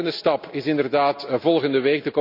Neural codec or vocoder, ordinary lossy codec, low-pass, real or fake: none; none; 5.4 kHz; real